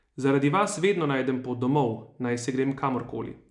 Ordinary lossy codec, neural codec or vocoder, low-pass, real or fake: none; none; 10.8 kHz; real